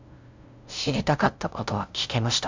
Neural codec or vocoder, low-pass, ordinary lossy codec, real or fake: codec, 16 kHz, 0.5 kbps, FunCodec, trained on LibriTTS, 25 frames a second; 7.2 kHz; MP3, 64 kbps; fake